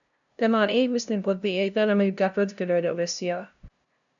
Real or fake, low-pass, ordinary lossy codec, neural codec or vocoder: fake; 7.2 kHz; MP3, 96 kbps; codec, 16 kHz, 0.5 kbps, FunCodec, trained on LibriTTS, 25 frames a second